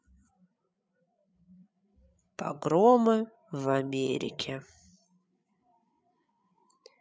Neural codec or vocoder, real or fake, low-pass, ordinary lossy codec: codec, 16 kHz, 8 kbps, FreqCodec, larger model; fake; none; none